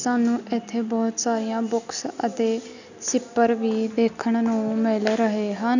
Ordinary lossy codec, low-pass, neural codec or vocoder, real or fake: none; 7.2 kHz; none; real